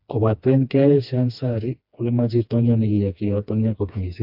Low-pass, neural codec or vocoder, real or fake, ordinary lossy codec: 5.4 kHz; codec, 16 kHz, 2 kbps, FreqCodec, smaller model; fake; AAC, 48 kbps